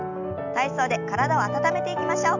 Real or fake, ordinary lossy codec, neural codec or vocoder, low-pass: real; none; none; 7.2 kHz